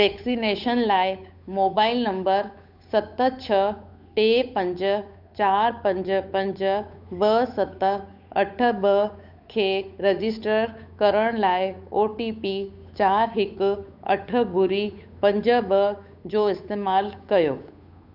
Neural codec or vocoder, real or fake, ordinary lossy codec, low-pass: codec, 16 kHz, 8 kbps, FunCodec, trained on Chinese and English, 25 frames a second; fake; none; 5.4 kHz